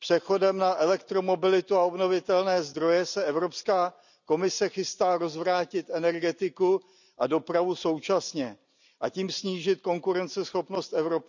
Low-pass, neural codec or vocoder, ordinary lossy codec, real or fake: 7.2 kHz; none; none; real